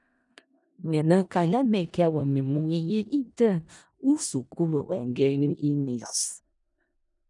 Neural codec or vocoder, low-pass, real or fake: codec, 16 kHz in and 24 kHz out, 0.4 kbps, LongCat-Audio-Codec, four codebook decoder; 10.8 kHz; fake